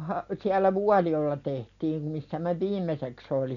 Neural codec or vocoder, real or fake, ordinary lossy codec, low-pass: none; real; none; 7.2 kHz